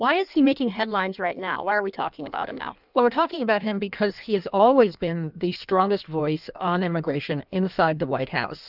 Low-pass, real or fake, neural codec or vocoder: 5.4 kHz; fake; codec, 16 kHz in and 24 kHz out, 1.1 kbps, FireRedTTS-2 codec